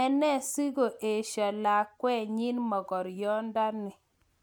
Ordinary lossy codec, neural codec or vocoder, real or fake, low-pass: none; none; real; none